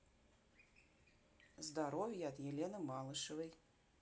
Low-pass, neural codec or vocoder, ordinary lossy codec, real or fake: none; none; none; real